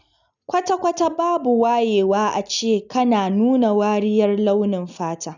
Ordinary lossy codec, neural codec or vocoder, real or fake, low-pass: none; none; real; 7.2 kHz